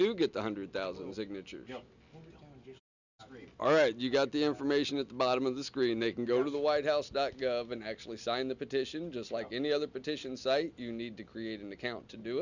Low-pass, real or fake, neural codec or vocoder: 7.2 kHz; real; none